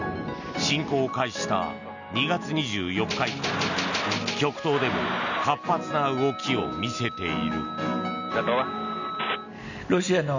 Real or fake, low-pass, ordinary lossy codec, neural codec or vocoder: real; 7.2 kHz; none; none